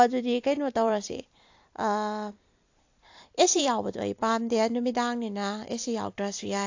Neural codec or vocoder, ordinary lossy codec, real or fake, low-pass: vocoder, 44.1 kHz, 128 mel bands every 256 samples, BigVGAN v2; AAC, 48 kbps; fake; 7.2 kHz